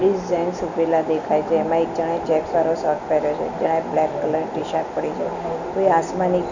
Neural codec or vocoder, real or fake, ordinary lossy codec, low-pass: none; real; none; 7.2 kHz